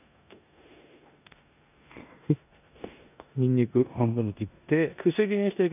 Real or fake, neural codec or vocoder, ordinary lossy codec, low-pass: fake; codec, 16 kHz in and 24 kHz out, 0.9 kbps, LongCat-Audio-Codec, four codebook decoder; none; 3.6 kHz